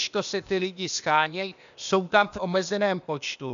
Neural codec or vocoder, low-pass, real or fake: codec, 16 kHz, 0.8 kbps, ZipCodec; 7.2 kHz; fake